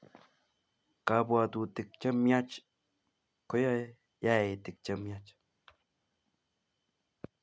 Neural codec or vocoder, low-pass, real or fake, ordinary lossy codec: none; none; real; none